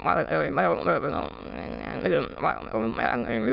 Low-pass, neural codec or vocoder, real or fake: 5.4 kHz; autoencoder, 22.05 kHz, a latent of 192 numbers a frame, VITS, trained on many speakers; fake